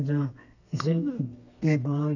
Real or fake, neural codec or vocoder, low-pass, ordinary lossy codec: fake; codec, 32 kHz, 1.9 kbps, SNAC; 7.2 kHz; none